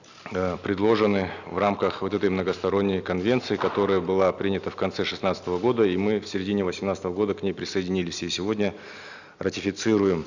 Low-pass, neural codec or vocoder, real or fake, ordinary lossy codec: 7.2 kHz; none; real; none